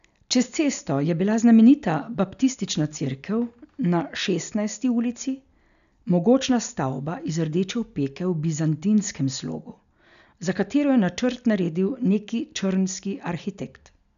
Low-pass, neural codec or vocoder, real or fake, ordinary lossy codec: 7.2 kHz; none; real; none